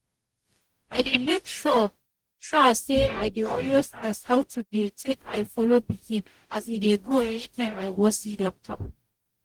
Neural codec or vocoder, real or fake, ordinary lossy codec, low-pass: codec, 44.1 kHz, 0.9 kbps, DAC; fake; Opus, 24 kbps; 19.8 kHz